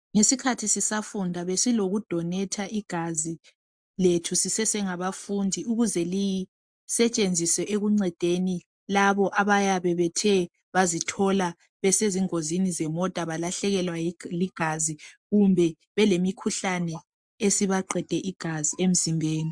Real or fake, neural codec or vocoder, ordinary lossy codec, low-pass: real; none; MP3, 64 kbps; 9.9 kHz